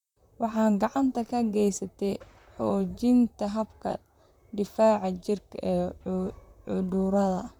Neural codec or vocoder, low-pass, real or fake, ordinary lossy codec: vocoder, 44.1 kHz, 128 mel bands, Pupu-Vocoder; 19.8 kHz; fake; none